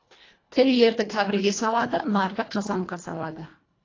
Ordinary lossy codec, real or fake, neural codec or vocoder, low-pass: AAC, 32 kbps; fake; codec, 24 kHz, 1.5 kbps, HILCodec; 7.2 kHz